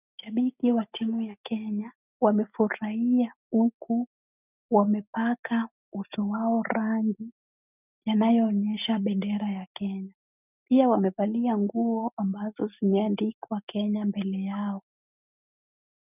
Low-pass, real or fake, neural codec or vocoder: 3.6 kHz; real; none